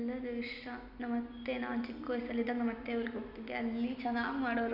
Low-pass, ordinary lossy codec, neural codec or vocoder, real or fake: 5.4 kHz; none; none; real